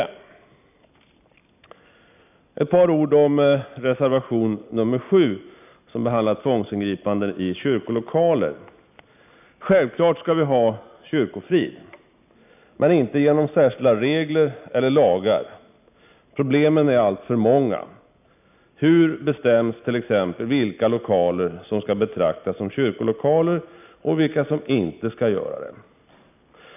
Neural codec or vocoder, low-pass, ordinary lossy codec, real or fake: none; 3.6 kHz; none; real